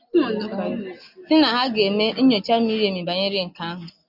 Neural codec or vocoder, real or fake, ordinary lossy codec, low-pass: none; real; AAC, 48 kbps; 5.4 kHz